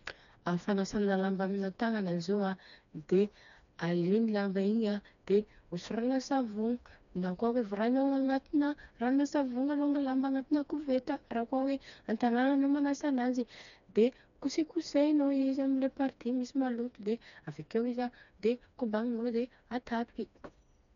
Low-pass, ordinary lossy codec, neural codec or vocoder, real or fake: 7.2 kHz; MP3, 96 kbps; codec, 16 kHz, 2 kbps, FreqCodec, smaller model; fake